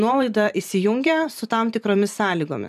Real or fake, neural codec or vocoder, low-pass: fake; vocoder, 44.1 kHz, 128 mel bands every 512 samples, BigVGAN v2; 14.4 kHz